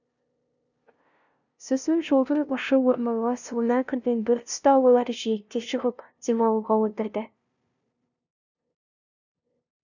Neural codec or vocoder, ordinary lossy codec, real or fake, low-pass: codec, 16 kHz, 0.5 kbps, FunCodec, trained on LibriTTS, 25 frames a second; none; fake; 7.2 kHz